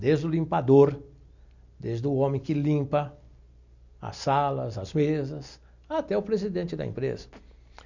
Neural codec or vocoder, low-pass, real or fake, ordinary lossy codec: none; 7.2 kHz; real; none